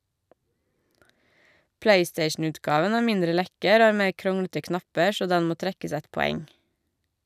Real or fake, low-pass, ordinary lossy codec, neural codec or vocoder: real; 14.4 kHz; none; none